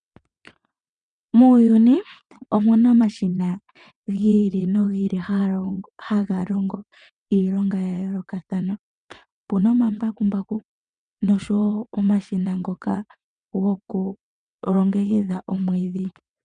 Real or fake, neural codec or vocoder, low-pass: fake; vocoder, 22.05 kHz, 80 mel bands, WaveNeXt; 9.9 kHz